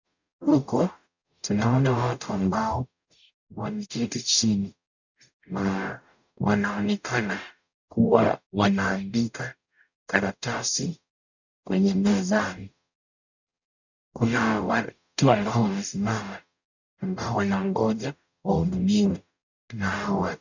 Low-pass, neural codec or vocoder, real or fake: 7.2 kHz; codec, 44.1 kHz, 0.9 kbps, DAC; fake